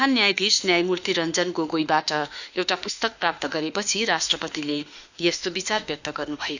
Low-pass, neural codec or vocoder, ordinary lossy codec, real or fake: 7.2 kHz; autoencoder, 48 kHz, 32 numbers a frame, DAC-VAE, trained on Japanese speech; none; fake